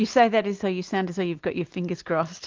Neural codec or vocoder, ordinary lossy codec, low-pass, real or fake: none; Opus, 24 kbps; 7.2 kHz; real